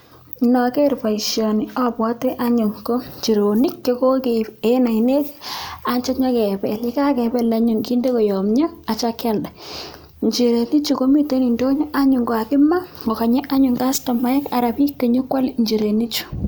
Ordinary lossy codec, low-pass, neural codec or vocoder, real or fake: none; none; none; real